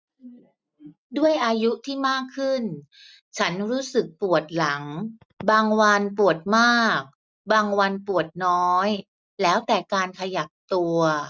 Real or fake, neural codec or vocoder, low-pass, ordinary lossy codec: real; none; none; none